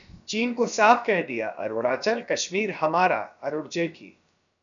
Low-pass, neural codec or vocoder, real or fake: 7.2 kHz; codec, 16 kHz, about 1 kbps, DyCAST, with the encoder's durations; fake